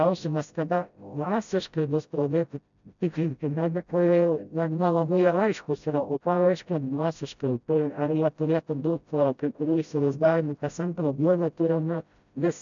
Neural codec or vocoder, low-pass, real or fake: codec, 16 kHz, 0.5 kbps, FreqCodec, smaller model; 7.2 kHz; fake